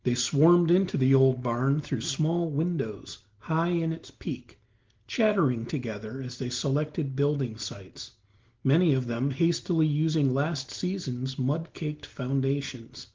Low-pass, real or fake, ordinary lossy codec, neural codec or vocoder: 7.2 kHz; real; Opus, 16 kbps; none